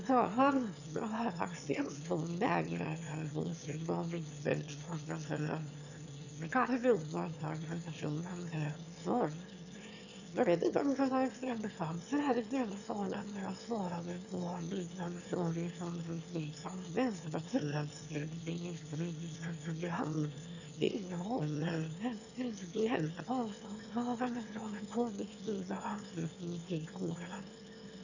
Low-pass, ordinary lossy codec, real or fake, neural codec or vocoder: 7.2 kHz; none; fake; autoencoder, 22.05 kHz, a latent of 192 numbers a frame, VITS, trained on one speaker